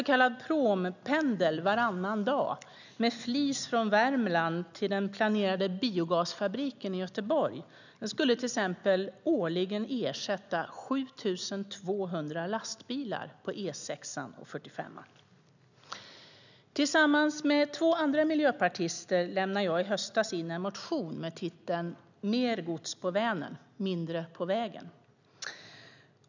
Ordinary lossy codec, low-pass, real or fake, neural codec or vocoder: none; 7.2 kHz; real; none